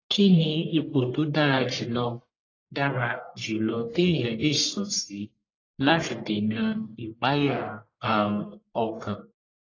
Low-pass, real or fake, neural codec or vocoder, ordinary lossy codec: 7.2 kHz; fake; codec, 44.1 kHz, 1.7 kbps, Pupu-Codec; AAC, 32 kbps